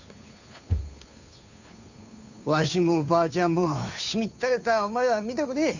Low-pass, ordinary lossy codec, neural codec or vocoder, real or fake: 7.2 kHz; none; codec, 16 kHz, 2 kbps, FunCodec, trained on Chinese and English, 25 frames a second; fake